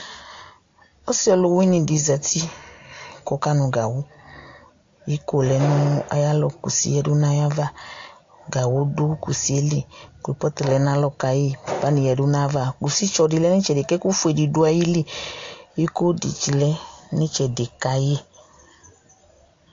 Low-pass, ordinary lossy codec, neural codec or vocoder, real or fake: 7.2 kHz; AAC, 48 kbps; none; real